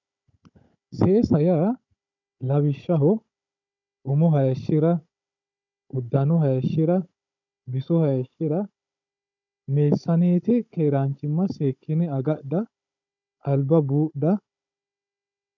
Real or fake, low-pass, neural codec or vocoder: fake; 7.2 kHz; codec, 16 kHz, 16 kbps, FunCodec, trained on Chinese and English, 50 frames a second